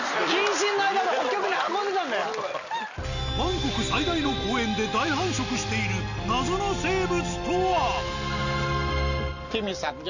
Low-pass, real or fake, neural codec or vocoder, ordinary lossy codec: 7.2 kHz; real; none; none